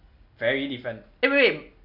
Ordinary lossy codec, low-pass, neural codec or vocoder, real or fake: none; 5.4 kHz; none; real